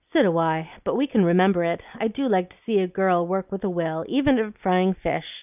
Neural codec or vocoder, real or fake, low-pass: none; real; 3.6 kHz